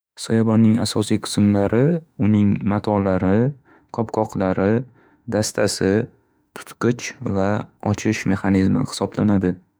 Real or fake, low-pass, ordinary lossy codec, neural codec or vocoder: fake; none; none; autoencoder, 48 kHz, 32 numbers a frame, DAC-VAE, trained on Japanese speech